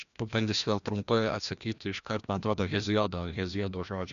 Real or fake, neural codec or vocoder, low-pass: fake; codec, 16 kHz, 1 kbps, FreqCodec, larger model; 7.2 kHz